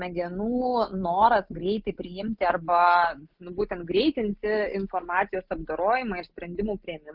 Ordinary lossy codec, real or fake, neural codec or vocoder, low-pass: Opus, 32 kbps; real; none; 5.4 kHz